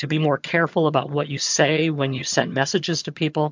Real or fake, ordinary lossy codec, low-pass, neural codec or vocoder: fake; MP3, 64 kbps; 7.2 kHz; vocoder, 22.05 kHz, 80 mel bands, HiFi-GAN